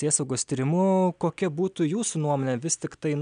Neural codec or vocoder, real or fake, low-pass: none; real; 9.9 kHz